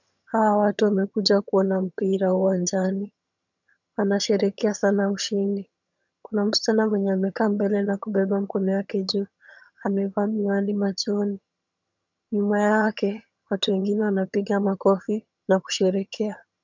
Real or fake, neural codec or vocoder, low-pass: fake; vocoder, 22.05 kHz, 80 mel bands, HiFi-GAN; 7.2 kHz